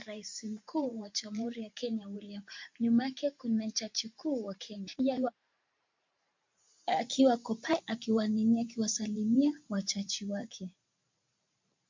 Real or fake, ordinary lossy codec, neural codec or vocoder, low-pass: fake; MP3, 48 kbps; vocoder, 44.1 kHz, 128 mel bands every 512 samples, BigVGAN v2; 7.2 kHz